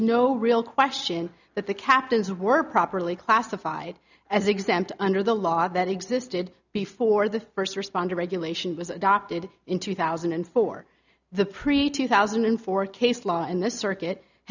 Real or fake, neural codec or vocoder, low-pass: real; none; 7.2 kHz